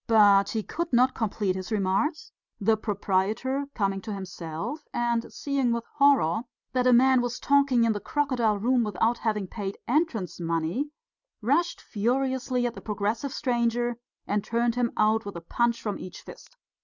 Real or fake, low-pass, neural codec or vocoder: real; 7.2 kHz; none